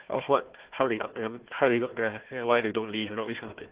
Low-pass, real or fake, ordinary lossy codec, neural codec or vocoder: 3.6 kHz; fake; Opus, 16 kbps; codec, 16 kHz, 1 kbps, FunCodec, trained on Chinese and English, 50 frames a second